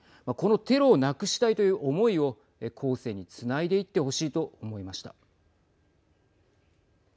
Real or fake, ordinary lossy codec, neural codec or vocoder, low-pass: real; none; none; none